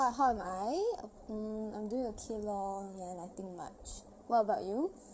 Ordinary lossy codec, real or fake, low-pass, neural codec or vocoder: none; fake; none; codec, 16 kHz, 8 kbps, FreqCodec, larger model